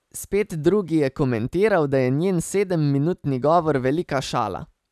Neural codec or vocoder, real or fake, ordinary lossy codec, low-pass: none; real; none; 14.4 kHz